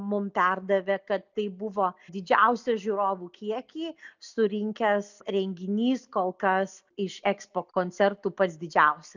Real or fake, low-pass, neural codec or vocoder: real; 7.2 kHz; none